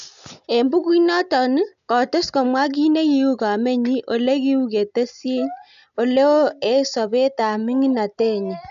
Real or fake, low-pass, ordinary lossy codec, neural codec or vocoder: real; 7.2 kHz; AAC, 96 kbps; none